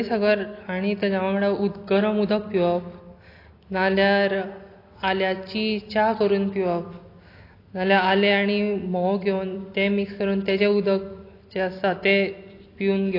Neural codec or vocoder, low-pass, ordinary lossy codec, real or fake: none; 5.4 kHz; AAC, 32 kbps; real